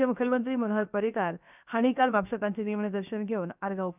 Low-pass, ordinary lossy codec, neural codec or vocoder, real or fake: 3.6 kHz; none; codec, 16 kHz, about 1 kbps, DyCAST, with the encoder's durations; fake